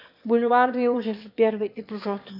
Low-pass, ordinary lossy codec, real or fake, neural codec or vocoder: 5.4 kHz; AAC, 48 kbps; fake; autoencoder, 22.05 kHz, a latent of 192 numbers a frame, VITS, trained on one speaker